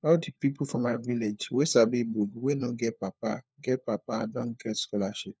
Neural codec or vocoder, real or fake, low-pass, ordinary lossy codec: codec, 16 kHz, 4 kbps, FunCodec, trained on LibriTTS, 50 frames a second; fake; none; none